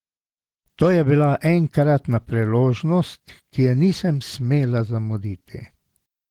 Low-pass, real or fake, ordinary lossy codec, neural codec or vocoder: 19.8 kHz; real; Opus, 16 kbps; none